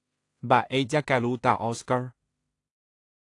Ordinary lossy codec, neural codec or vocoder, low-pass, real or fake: AAC, 48 kbps; codec, 16 kHz in and 24 kHz out, 0.4 kbps, LongCat-Audio-Codec, two codebook decoder; 10.8 kHz; fake